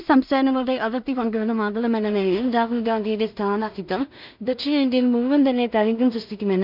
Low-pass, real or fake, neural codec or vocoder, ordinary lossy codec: 5.4 kHz; fake; codec, 16 kHz in and 24 kHz out, 0.4 kbps, LongCat-Audio-Codec, two codebook decoder; none